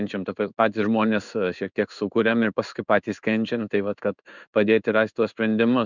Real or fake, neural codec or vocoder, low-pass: fake; codec, 16 kHz in and 24 kHz out, 1 kbps, XY-Tokenizer; 7.2 kHz